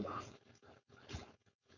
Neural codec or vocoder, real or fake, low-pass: codec, 16 kHz, 4.8 kbps, FACodec; fake; 7.2 kHz